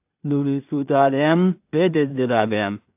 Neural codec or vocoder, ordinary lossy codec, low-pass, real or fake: codec, 16 kHz in and 24 kHz out, 0.4 kbps, LongCat-Audio-Codec, two codebook decoder; AAC, 32 kbps; 3.6 kHz; fake